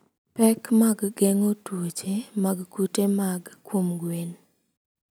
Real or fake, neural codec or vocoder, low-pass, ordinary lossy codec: real; none; none; none